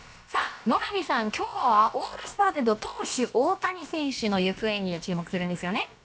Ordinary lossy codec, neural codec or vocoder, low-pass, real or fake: none; codec, 16 kHz, about 1 kbps, DyCAST, with the encoder's durations; none; fake